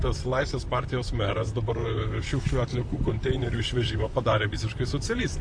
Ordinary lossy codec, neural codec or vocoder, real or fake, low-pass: AAC, 64 kbps; vocoder, 44.1 kHz, 128 mel bands, Pupu-Vocoder; fake; 9.9 kHz